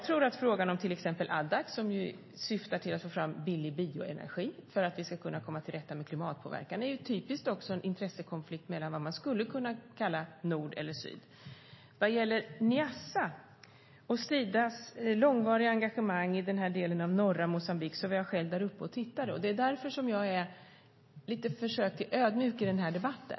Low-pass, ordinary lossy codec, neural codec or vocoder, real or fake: 7.2 kHz; MP3, 24 kbps; none; real